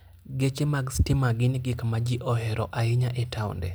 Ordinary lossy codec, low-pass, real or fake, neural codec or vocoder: none; none; real; none